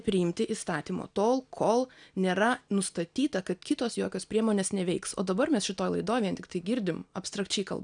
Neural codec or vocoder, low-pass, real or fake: none; 9.9 kHz; real